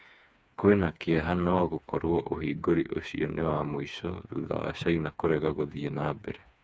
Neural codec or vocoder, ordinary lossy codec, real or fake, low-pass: codec, 16 kHz, 4 kbps, FreqCodec, smaller model; none; fake; none